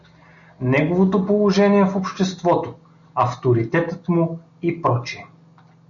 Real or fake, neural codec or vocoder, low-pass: real; none; 7.2 kHz